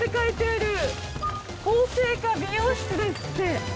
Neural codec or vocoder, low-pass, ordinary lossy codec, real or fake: none; none; none; real